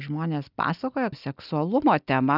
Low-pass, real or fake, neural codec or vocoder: 5.4 kHz; real; none